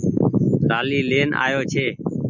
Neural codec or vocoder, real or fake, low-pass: none; real; 7.2 kHz